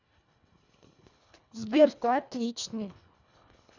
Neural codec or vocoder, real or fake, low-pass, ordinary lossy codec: codec, 24 kHz, 1.5 kbps, HILCodec; fake; 7.2 kHz; none